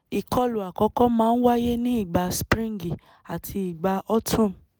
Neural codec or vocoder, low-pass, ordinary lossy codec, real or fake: none; none; none; real